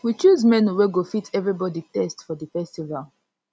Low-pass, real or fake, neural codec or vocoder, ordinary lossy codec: none; real; none; none